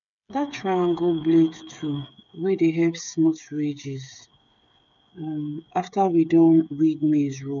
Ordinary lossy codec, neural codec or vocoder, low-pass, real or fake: none; codec, 16 kHz, 8 kbps, FreqCodec, smaller model; 7.2 kHz; fake